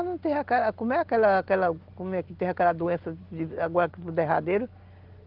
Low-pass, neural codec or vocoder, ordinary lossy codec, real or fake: 5.4 kHz; none; Opus, 16 kbps; real